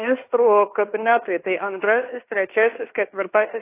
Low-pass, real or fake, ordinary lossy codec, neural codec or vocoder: 3.6 kHz; fake; AAC, 24 kbps; codec, 16 kHz in and 24 kHz out, 0.9 kbps, LongCat-Audio-Codec, fine tuned four codebook decoder